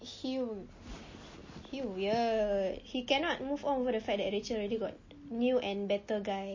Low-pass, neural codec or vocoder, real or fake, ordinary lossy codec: 7.2 kHz; none; real; MP3, 32 kbps